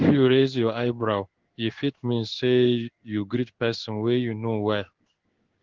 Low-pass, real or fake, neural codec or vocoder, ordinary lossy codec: 7.2 kHz; fake; codec, 16 kHz in and 24 kHz out, 1 kbps, XY-Tokenizer; Opus, 16 kbps